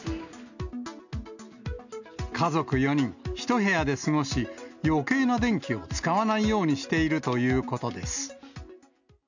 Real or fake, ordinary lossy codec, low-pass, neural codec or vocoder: real; none; 7.2 kHz; none